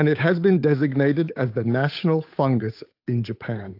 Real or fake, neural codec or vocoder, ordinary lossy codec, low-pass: fake; codec, 16 kHz, 8 kbps, FunCodec, trained on Chinese and English, 25 frames a second; AAC, 32 kbps; 5.4 kHz